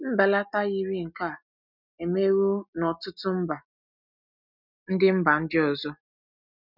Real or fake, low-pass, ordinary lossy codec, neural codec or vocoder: real; 5.4 kHz; none; none